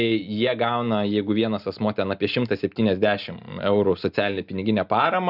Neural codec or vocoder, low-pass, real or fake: none; 5.4 kHz; real